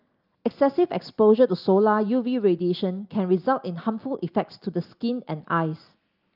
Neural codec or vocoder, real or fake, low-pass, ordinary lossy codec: none; real; 5.4 kHz; Opus, 32 kbps